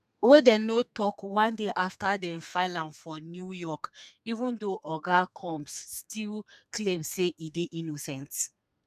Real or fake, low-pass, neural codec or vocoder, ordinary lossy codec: fake; 14.4 kHz; codec, 32 kHz, 1.9 kbps, SNAC; none